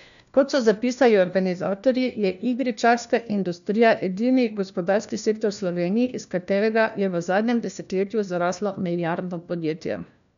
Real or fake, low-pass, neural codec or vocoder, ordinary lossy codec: fake; 7.2 kHz; codec, 16 kHz, 1 kbps, FunCodec, trained on LibriTTS, 50 frames a second; none